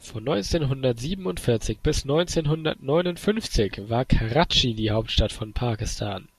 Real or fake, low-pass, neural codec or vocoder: real; 14.4 kHz; none